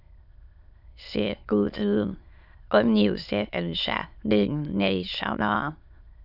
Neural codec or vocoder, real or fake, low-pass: autoencoder, 22.05 kHz, a latent of 192 numbers a frame, VITS, trained on many speakers; fake; 5.4 kHz